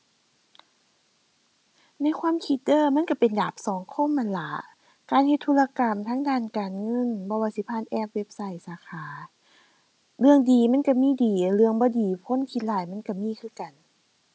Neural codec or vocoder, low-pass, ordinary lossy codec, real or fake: none; none; none; real